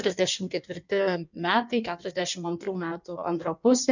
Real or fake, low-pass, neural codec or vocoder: fake; 7.2 kHz; codec, 16 kHz in and 24 kHz out, 1.1 kbps, FireRedTTS-2 codec